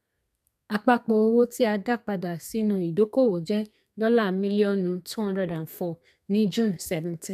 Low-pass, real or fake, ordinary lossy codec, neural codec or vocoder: 14.4 kHz; fake; none; codec, 32 kHz, 1.9 kbps, SNAC